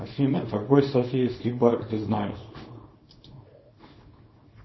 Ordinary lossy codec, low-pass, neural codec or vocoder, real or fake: MP3, 24 kbps; 7.2 kHz; codec, 24 kHz, 0.9 kbps, WavTokenizer, small release; fake